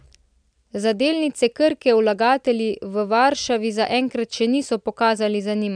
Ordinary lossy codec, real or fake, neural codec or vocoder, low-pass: none; real; none; 9.9 kHz